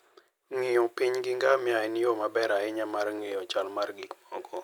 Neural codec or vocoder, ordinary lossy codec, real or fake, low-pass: none; none; real; none